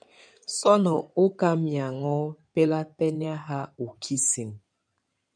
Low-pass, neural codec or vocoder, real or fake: 9.9 kHz; codec, 16 kHz in and 24 kHz out, 2.2 kbps, FireRedTTS-2 codec; fake